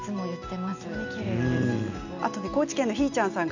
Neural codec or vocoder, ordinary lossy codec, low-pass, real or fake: none; none; 7.2 kHz; real